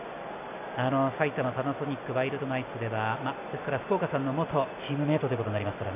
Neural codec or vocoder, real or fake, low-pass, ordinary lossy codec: none; real; 3.6 kHz; none